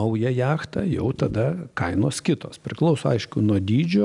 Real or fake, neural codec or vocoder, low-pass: real; none; 10.8 kHz